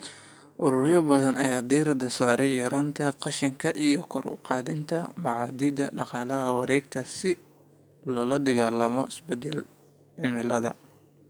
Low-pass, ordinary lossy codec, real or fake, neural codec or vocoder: none; none; fake; codec, 44.1 kHz, 2.6 kbps, SNAC